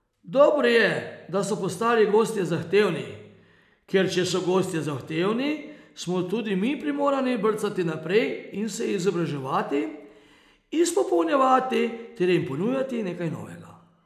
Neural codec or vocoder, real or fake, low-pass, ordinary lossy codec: none; real; 14.4 kHz; none